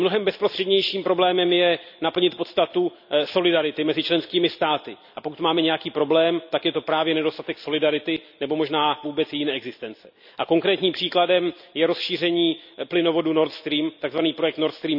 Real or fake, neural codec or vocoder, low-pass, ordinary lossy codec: real; none; 5.4 kHz; none